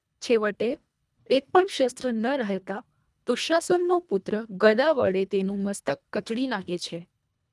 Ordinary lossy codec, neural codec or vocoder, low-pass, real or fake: none; codec, 24 kHz, 1.5 kbps, HILCodec; none; fake